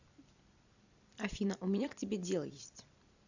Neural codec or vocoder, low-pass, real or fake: vocoder, 44.1 kHz, 128 mel bands every 256 samples, BigVGAN v2; 7.2 kHz; fake